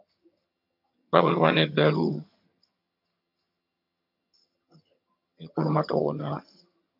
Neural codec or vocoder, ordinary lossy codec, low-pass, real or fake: vocoder, 22.05 kHz, 80 mel bands, HiFi-GAN; MP3, 48 kbps; 5.4 kHz; fake